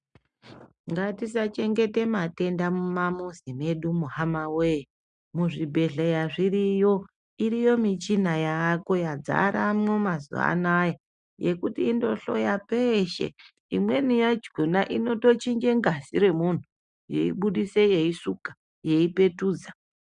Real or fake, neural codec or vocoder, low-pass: real; none; 9.9 kHz